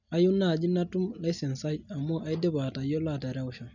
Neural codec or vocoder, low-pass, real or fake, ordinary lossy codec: none; 7.2 kHz; real; none